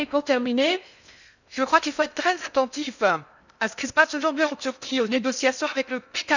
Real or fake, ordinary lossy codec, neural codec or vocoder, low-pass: fake; none; codec, 16 kHz in and 24 kHz out, 0.6 kbps, FocalCodec, streaming, 2048 codes; 7.2 kHz